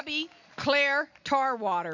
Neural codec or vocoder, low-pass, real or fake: none; 7.2 kHz; real